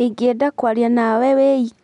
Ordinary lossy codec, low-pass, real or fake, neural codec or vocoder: none; 10.8 kHz; real; none